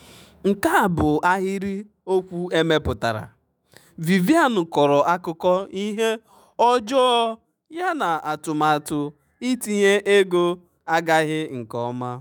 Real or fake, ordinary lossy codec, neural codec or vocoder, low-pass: fake; none; autoencoder, 48 kHz, 128 numbers a frame, DAC-VAE, trained on Japanese speech; none